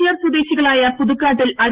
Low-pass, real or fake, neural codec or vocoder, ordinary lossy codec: 3.6 kHz; real; none; Opus, 16 kbps